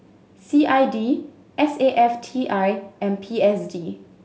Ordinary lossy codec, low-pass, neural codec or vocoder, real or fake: none; none; none; real